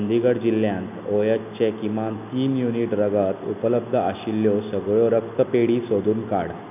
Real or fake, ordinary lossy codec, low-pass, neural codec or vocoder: real; none; 3.6 kHz; none